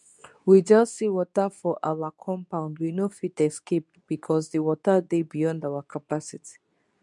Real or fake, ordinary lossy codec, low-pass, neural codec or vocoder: fake; none; 10.8 kHz; codec, 24 kHz, 0.9 kbps, WavTokenizer, medium speech release version 2